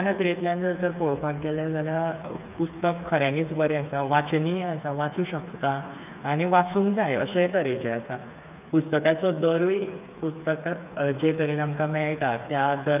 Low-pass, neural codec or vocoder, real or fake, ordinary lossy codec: 3.6 kHz; codec, 16 kHz, 4 kbps, FreqCodec, smaller model; fake; none